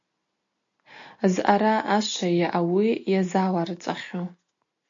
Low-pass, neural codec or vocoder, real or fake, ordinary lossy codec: 7.2 kHz; none; real; AAC, 64 kbps